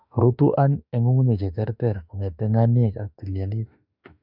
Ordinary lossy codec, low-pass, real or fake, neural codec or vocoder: none; 5.4 kHz; fake; autoencoder, 48 kHz, 32 numbers a frame, DAC-VAE, trained on Japanese speech